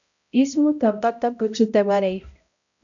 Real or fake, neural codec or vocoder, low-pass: fake; codec, 16 kHz, 0.5 kbps, X-Codec, HuBERT features, trained on balanced general audio; 7.2 kHz